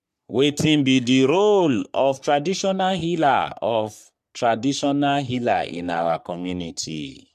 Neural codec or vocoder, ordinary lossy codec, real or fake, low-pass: codec, 44.1 kHz, 3.4 kbps, Pupu-Codec; MP3, 96 kbps; fake; 14.4 kHz